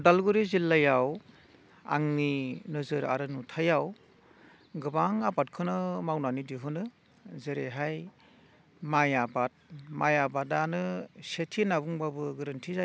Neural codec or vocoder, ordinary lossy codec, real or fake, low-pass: none; none; real; none